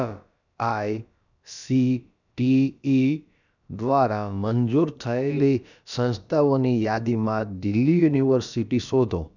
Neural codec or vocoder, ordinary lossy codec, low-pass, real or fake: codec, 16 kHz, about 1 kbps, DyCAST, with the encoder's durations; none; 7.2 kHz; fake